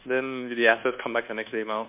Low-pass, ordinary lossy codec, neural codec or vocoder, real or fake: 3.6 kHz; MP3, 32 kbps; codec, 24 kHz, 1.2 kbps, DualCodec; fake